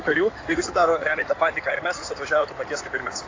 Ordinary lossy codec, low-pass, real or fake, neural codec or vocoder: AAC, 32 kbps; 7.2 kHz; fake; codec, 16 kHz in and 24 kHz out, 2.2 kbps, FireRedTTS-2 codec